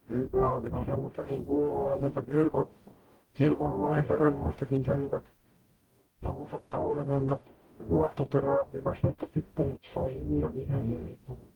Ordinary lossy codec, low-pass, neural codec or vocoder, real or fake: Opus, 24 kbps; 19.8 kHz; codec, 44.1 kHz, 0.9 kbps, DAC; fake